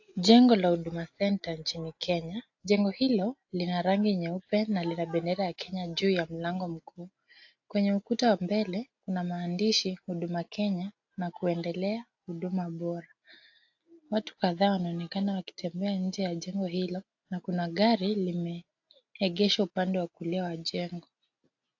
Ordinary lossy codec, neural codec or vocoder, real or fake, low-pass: AAC, 48 kbps; none; real; 7.2 kHz